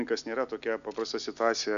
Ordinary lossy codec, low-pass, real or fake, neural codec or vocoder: MP3, 96 kbps; 7.2 kHz; real; none